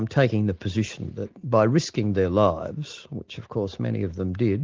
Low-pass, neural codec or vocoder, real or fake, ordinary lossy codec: 7.2 kHz; none; real; Opus, 16 kbps